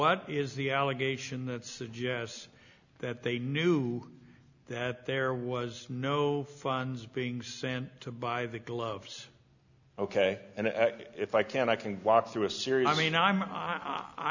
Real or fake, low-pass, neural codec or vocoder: real; 7.2 kHz; none